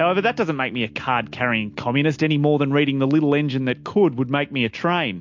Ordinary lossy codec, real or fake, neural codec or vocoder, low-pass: MP3, 48 kbps; real; none; 7.2 kHz